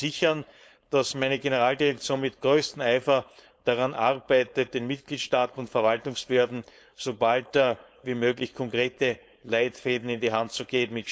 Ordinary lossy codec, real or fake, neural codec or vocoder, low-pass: none; fake; codec, 16 kHz, 4.8 kbps, FACodec; none